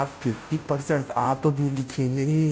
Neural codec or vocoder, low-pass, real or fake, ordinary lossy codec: codec, 16 kHz, 0.5 kbps, FunCodec, trained on Chinese and English, 25 frames a second; none; fake; none